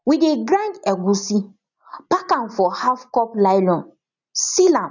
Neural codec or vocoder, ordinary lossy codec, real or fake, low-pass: none; none; real; 7.2 kHz